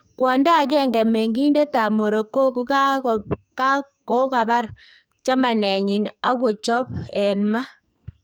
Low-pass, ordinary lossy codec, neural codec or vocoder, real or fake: none; none; codec, 44.1 kHz, 2.6 kbps, SNAC; fake